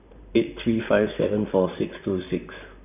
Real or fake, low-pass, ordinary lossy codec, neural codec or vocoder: fake; 3.6 kHz; none; vocoder, 44.1 kHz, 128 mel bands, Pupu-Vocoder